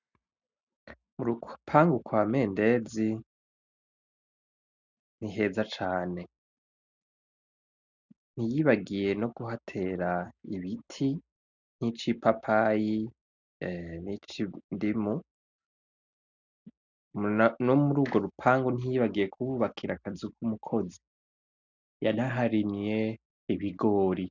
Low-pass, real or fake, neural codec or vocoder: 7.2 kHz; real; none